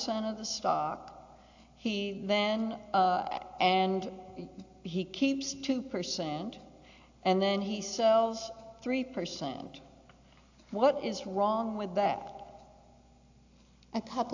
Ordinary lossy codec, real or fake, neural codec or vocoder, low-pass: AAC, 48 kbps; real; none; 7.2 kHz